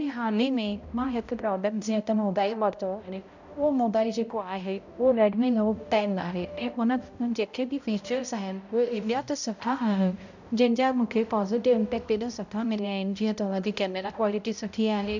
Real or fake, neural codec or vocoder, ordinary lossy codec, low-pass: fake; codec, 16 kHz, 0.5 kbps, X-Codec, HuBERT features, trained on balanced general audio; none; 7.2 kHz